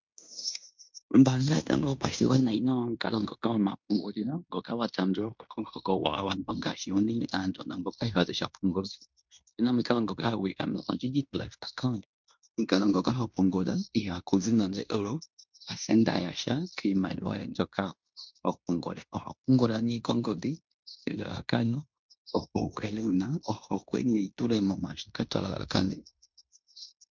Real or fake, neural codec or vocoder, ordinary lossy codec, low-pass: fake; codec, 16 kHz in and 24 kHz out, 0.9 kbps, LongCat-Audio-Codec, fine tuned four codebook decoder; MP3, 64 kbps; 7.2 kHz